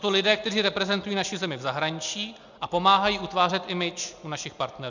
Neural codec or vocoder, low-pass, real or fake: none; 7.2 kHz; real